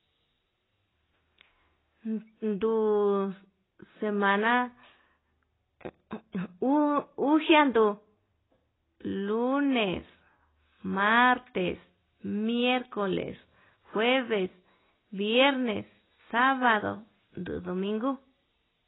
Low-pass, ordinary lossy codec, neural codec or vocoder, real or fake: 7.2 kHz; AAC, 16 kbps; none; real